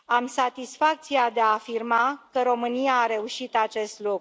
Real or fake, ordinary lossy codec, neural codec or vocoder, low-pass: real; none; none; none